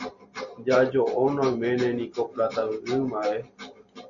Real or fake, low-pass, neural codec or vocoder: real; 7.2 kHz; none